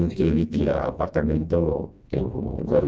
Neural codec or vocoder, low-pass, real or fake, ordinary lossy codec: codec, 16 kHz, 1 kbps, FreqCodec, smaller model; none; fake; none